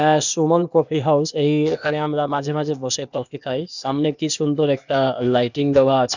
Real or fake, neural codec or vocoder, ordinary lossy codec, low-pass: fake; codec, 16 kHz, 0.8 kbps, ZipCodec; none; 7.2 kHz